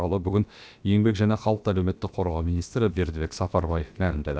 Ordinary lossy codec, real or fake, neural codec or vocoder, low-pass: none; fake; codec, 16 kHz, about 1 kbps, DyCAST, with the encoder's durations; none